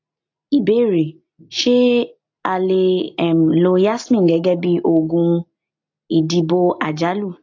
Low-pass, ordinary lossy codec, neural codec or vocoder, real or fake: 7.2 kHz; AAC, 48 kbps; none; real